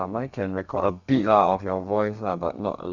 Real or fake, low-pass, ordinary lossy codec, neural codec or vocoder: fake; 7.2 kHz; none; codec, 32 kHz, 1.9 kbps, SNAC